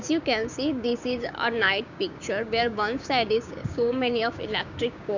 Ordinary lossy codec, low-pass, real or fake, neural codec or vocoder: none; 7.2 kHz; fake; codec, 16 kHz, 6 kbps, DAC